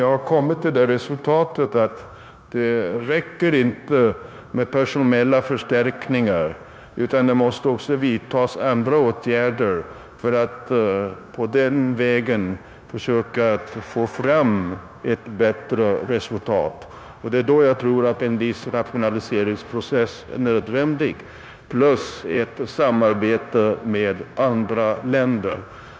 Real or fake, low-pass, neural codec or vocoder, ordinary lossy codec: fake; none; codec, 16 kHz, 0.9 kbps, LongCat-Audio-Codec; none